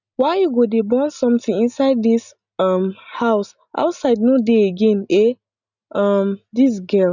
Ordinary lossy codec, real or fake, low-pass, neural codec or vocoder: none; real; 7.2 kHz; none